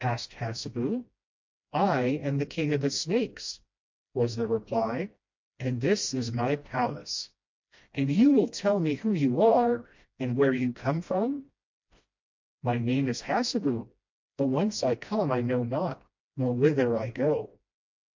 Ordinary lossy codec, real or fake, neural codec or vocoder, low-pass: MP3, 48 kbps; fake; codec, 16 kHz, 1 kbps, FreqCodec, smaller model; 7.2 kHz